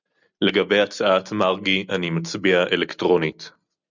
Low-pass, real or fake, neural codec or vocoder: 7.2 kHz; real; none